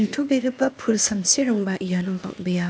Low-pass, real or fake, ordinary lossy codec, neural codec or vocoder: none; fake; none; codec, 16 kHz, 0.8 kbps, ZipCodec